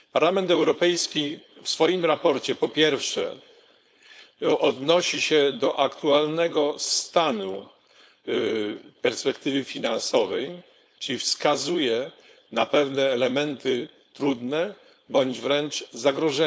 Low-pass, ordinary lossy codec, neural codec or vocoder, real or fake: none; none; codec, 16 kHz, 4.8 kbps, FACodec; fake